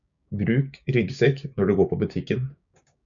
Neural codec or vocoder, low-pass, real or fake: codec, 16 kHz, 6 kbps, DAC; 7.2 kHz; fake